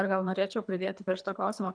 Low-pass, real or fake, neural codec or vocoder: 9.9 kHz; fake; codec, 24 kHz, 3 kbps, HILCodec